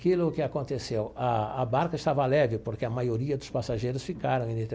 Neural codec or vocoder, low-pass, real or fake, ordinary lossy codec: none; none; real; none